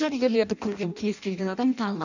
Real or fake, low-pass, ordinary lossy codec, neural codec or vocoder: fake; 7.2 kHz; none; codec, 16 kHz in and 24 kHz out, 0.6 kbps, FireRedTTS-2 codec